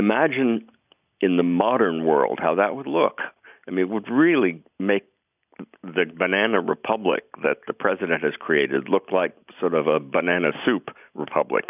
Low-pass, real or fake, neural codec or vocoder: 3.6 kHz; real; none